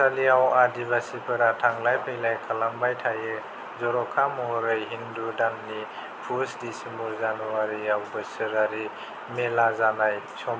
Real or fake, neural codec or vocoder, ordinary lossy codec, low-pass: real; none; none; none